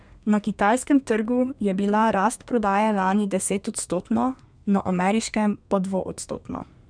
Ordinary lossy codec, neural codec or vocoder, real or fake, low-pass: none; codec, 32 kHz, 1.9 kbps, SNAC; fake; 9.9 kHz